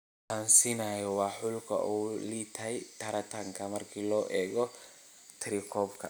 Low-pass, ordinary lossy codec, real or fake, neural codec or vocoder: none; none; real; none